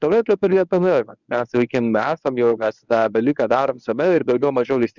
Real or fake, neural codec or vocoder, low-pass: fake; codec, 24 kHz, 0.9 kbps, WavTokenizer, medium speech release version 1; 7.2 kHz